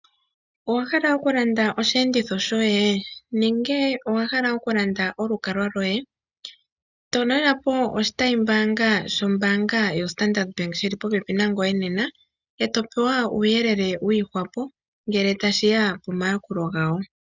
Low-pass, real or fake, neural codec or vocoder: 7.2 kHz; real; none